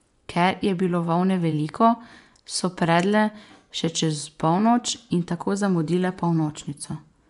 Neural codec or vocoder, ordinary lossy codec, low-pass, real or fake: vocoder, 24 kHz, 100 mel bands, Vocos; none; 10.8 kHz; fake